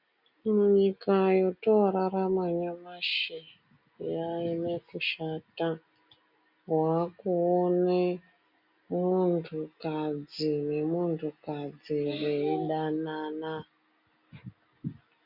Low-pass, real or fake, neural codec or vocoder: 5.4 kHz; real; none